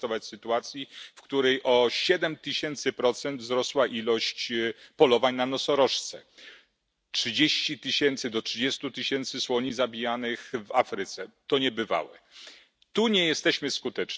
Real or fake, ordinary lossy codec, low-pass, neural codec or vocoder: real; none; none; none